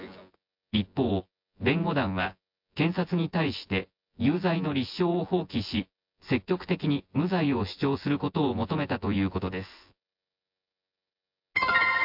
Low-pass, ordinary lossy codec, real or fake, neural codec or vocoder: 5.4 kHz; MP3, 48 kbps; fake; vocoder, 24 kHz, 100 mel bands, Vocos